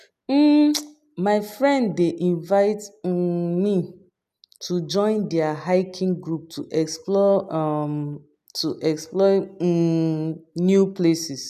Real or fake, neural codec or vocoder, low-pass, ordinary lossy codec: real; none; 14.4 kHz; none